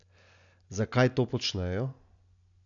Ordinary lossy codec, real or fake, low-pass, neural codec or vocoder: none; real; 7.2 kHz; none